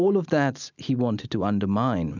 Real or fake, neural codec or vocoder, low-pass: real; none; 7.2 kHz